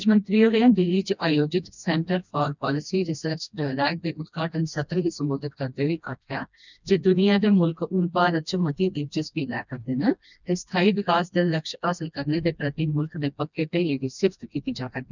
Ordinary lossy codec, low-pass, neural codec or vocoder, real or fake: none; 7.2 kHz; codec, 16 kHz, 1 kbps, FreqCodec, smaller model; fake